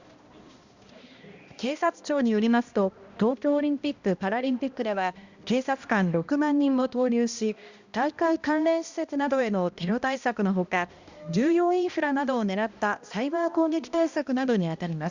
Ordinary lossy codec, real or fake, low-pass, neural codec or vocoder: Opus, 64 kbps; fake; 7.2 kHz; codec, 16 kHz, 1 kbps, X-Codec, HuBERT features, trained on balanced general audio